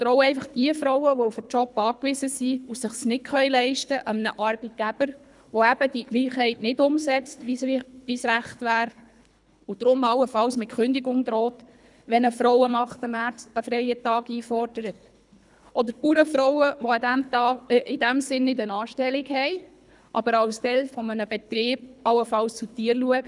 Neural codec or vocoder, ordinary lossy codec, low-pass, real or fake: codec, 24 kHz, 3 kbps, HILCodec; none; 10.8 kHz; fake